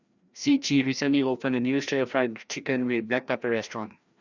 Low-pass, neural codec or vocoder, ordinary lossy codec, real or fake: 7.2 kHz; codec, 16 kHz, 1 kbps, FreqCodec, larger model; Opus, 64 kbps; fake